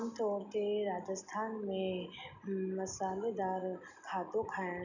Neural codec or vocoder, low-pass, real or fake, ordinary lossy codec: none; 7.2 kHz; real; none